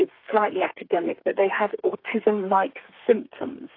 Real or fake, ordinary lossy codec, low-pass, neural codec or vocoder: fake; AAC, 32 kbps; 5.4 kHz; codec, 32 kHz, 1.9 kbps, SNAC